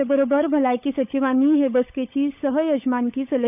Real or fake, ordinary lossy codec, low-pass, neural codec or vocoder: fake; none; 3.6 kHz; codec, 16 kHz, 16 kbps, FunCodec, trained on LibriTTS, 50 frames a second